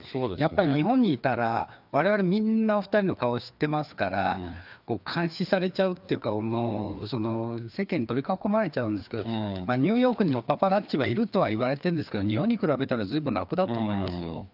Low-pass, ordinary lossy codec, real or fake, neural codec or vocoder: 5.4 kHz; none; fake; codec, 16 kHz, 2 kbps, FreqCodec, larger model